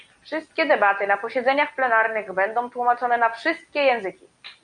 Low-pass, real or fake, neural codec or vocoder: 9.9 kHz; real; none